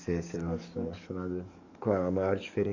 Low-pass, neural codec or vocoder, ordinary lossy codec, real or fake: 7.2 kHz; codec, 44.1 kHz, 7.8 kbps, DAC; Opus, 64 kbps; fake